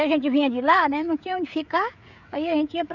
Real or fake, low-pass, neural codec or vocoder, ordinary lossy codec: fake; 7.2 kHz; codec, 16 kHz, 16 kbps, FreqCodec, smaller model; none